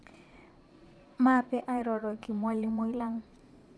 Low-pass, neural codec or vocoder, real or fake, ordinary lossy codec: none; vocoder, 22.05 kHz, 80 mel bands, Vocos; fake; none